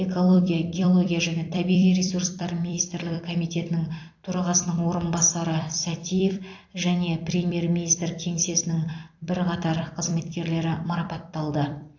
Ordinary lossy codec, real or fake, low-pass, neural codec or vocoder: AAC, 48 kbps; fake; 7.2 kHz; vocoder, 44.1 kHz, 128 mel bands every 256 samples, BigVGAN v2